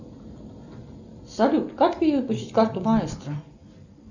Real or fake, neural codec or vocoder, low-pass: real; none; 7.2 kHz